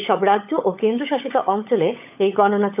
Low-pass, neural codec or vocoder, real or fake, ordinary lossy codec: 3.6 kHz; codec, 16 kHz, 16 kbps, FunCodec, trained on LibriTTS, 50 frames a second; fake; none